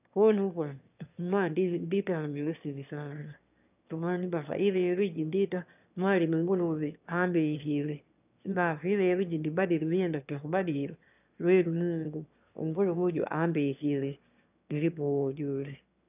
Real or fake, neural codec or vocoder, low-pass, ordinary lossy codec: fake; autoencoder, 22.05 kHz, a latent of 192 numbers a frame, VITS, trained on one speaker; 3.6 kHz; none